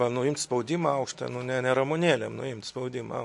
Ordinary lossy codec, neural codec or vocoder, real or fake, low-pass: MP3, 48 kbps; none; real; 10.8 kHz